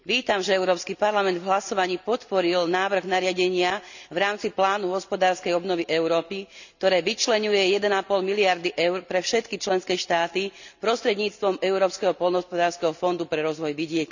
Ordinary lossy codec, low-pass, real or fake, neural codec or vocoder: none; 7.2 kHz; real; none